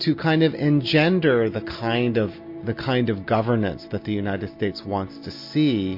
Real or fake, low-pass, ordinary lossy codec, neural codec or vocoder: real; 5.4 kHz; MP3, 32 kbps; none